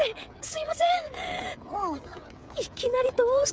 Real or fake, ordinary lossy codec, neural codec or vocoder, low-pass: fake; none; codec, 16 kHz, 16 kbps, FreqCodec, smaller model; none